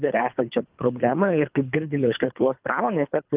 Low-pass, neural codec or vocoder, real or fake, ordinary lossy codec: 3.6 kHz; codec, 24 kHz, 3 kbps, HILCodec; fake; Opus, 24 kbps